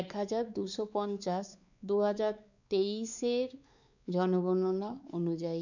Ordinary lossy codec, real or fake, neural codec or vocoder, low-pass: none; fake; codec, 16 kHz, 4 kbps, X-Codec, WavLM features, trained on Multilingual LibriSpeech; 7.2 kHz